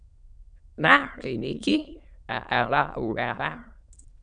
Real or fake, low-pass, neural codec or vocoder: fake; 9.9 kHz; autoencoder, 22.05 kHz, a latent of 192 numbers a frame, VITS, trained on many speakers